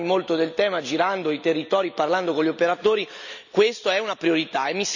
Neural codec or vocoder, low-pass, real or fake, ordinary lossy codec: none; 7.2 kHz; real; none